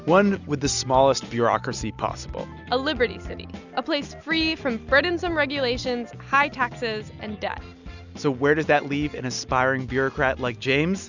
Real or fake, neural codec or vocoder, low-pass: real; none; 7.2 kHz